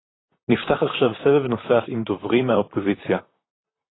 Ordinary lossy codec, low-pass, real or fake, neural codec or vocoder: AAC, 16 kbps; 7.2 kHz; fake; vocoder, 44.1 kHz, 128 mel bands, Pupu-Vocoder